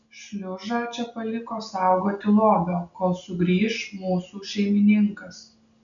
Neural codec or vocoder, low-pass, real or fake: none; 7.2 kHz; real